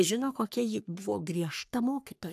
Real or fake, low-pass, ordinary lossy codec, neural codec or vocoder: fake; 14.4 kHz; AAC, 96 kbps; codec, 44.1 kHz, 3.4 kbps, Pupu-Codec